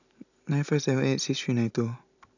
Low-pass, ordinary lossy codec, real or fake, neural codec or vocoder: 7.2 kHz; none; fake; vocoder, 44.1 kHz, 128 mel bands every 256 samples, BigVGAN v2